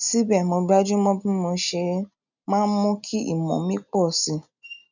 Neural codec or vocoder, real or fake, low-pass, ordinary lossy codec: none; real; 7.2 kHz; none